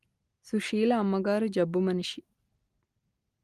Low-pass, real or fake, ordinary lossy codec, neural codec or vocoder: 14.4 kHz; real; Opus, 32 kbps; none